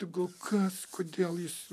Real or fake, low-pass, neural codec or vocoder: real; 14.4 kHz; none